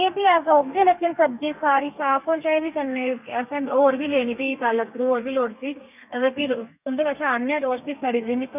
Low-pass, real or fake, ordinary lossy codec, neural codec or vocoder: 3.6 kHz; fake; none; codec, 32 kHz, 1.9 kbps, SNAC